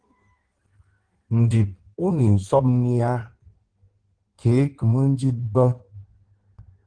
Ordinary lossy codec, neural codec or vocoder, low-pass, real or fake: Opus, 16 kbps; codec, 16 kHz in and 24 kHz out, 1.1 kbps, FireRedTTS-2 codec; 9.9 kHz; fake